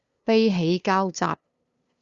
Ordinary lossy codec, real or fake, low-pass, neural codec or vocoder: Opus, 64 kbps; fake; 7.2 kHz; codec, 16 kHz, 2 kbps, FunCodec, trained on LibriTTS, 25 frames a second